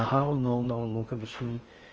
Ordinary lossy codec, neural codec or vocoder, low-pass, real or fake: Opus, 24 kbps; codec, 16 kHz in and 24 kHz out, 0.8 kbps, FocalCodec, streaming, 65536 codes; 7.2 kHz; fake